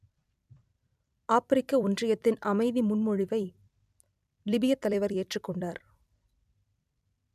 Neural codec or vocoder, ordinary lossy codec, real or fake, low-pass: vocoder, 44.1 kHz, 128 mel bands every 256 samples, BigVGAN v2; none; fake; 14.4 kHz